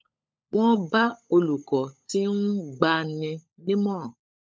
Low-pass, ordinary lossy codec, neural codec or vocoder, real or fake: none; none; codec, 16 kHz, 16 kbps, FunCodec, trained on LibriTTS, 50 frames a second; fake